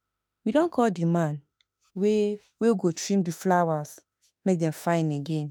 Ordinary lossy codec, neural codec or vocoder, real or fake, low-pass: none; autoencoder, 48 kHz, 32 numbers a frame, DAC-VAE, trained on Japanese speech; fake; none